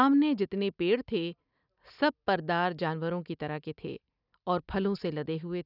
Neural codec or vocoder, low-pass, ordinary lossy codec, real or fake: none; 5.4 kHz; none; real